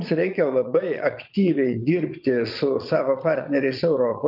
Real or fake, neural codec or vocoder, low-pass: fake; vocoder, 22.05 kHz, 80 mel bands, Vocos; 5.4 kHz